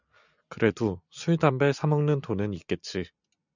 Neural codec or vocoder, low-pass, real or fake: none; 7.2 kHz; real